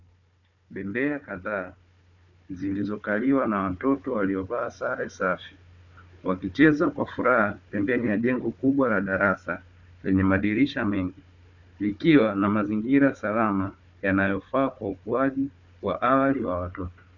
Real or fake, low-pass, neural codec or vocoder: fake; 7.2 kHz; codec, 16 kHz, 4 kbps, FunCodec, trained on Chinese and English, 50 frames a second